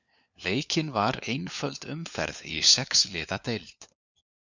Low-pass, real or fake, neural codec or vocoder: 7.2 kHz; fake; codec, 16 kHz, 4 kbps, FunCodec, trained on LibriTTS, 50 frames a second